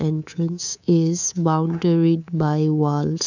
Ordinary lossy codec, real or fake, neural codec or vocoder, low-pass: none; fake; codec, 24 kHz, 3.1 kbps, DualCodec; 7.2 kHz